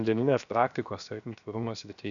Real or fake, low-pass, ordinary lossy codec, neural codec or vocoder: fake; 7.2 kHz; MP3, 96 kbps; codec, 16 kHz, 0.7 kbps, FocalCodec